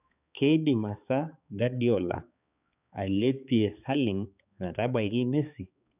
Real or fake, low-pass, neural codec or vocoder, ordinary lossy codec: fake; 3.6 kHz; codec, 16 kHz, 4 kbps, X-Codec, HuBERT features, trained on balanced general audio; none